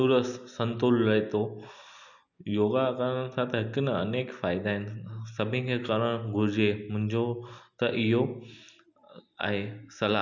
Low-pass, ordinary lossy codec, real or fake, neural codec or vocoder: 7.2 kHz; none; real; none